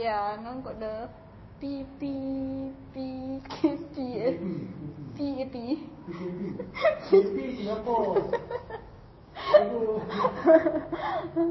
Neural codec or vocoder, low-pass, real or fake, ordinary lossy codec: codec, 16 kHz, 6 kbps, DAC; 7.2 kHz; fake; MP3, 24 kbps